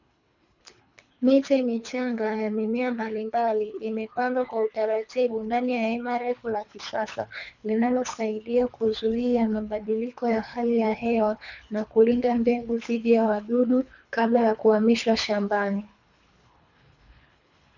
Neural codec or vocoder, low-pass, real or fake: codec, 24 kHz, 3 kbps, HILCodec; 7.2 kHz; fake